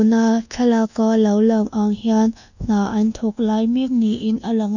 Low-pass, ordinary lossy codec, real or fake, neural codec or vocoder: 7.2 kHz; none; fake; codec, 24 kHz, 1.2 kbps, DualCodec